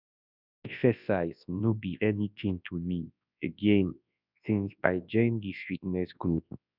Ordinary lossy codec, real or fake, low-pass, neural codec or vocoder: none; fake; 5.4 kHz; codec, 24 kHz, 0.9 kbps, WavTokenizer, large speech release